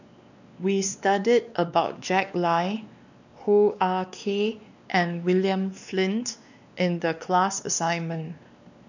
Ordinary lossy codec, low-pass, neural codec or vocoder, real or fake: none; 7.2 kHz; codec, 16 kHz, 2 kbps, X-Codec, WavLM features, trained on Multilingual LibriSpeech; fake